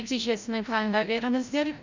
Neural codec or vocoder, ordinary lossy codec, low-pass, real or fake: codec, 16 kHz, 0.5 kbps, FreqCodec, larger model; Opus, 64 kbps; 7.2 kHz; fake